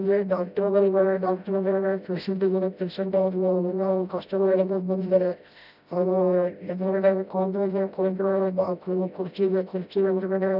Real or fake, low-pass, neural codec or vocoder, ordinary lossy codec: fake; 5.4 kHz; codec, 16 kHz, 0.5 kbps, FreqCodec, smaller model; none